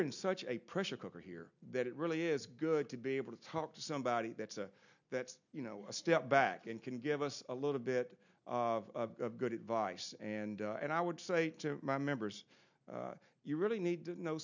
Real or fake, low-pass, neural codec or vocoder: real; 7.2 kHz; none